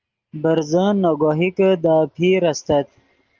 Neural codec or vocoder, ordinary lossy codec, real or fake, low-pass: none; Opus, 24 kbps; real; 7.2 kHz